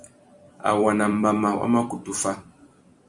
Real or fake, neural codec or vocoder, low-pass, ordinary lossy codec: fake; vocoder, 44.1 kHz, 128 mel bands every 256 samples, BigVGAN v2; 10.8 kHz; Opus, 64 kbps